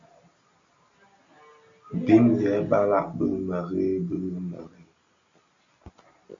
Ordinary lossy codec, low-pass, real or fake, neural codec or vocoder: MP3, 64 kbps; 7.2 kHz; real; none